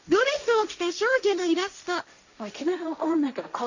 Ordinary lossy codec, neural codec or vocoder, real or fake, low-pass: none; codec, 16 kHz, 1.1 kbps, Voila-Tokenizer; fake; 7.2 kHz